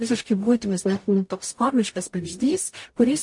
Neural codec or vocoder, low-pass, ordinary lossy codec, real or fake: codec, 44.1 kHz, 0.9 kbps, DAC; 10.8 kHz; MP3, 48 kbps; fake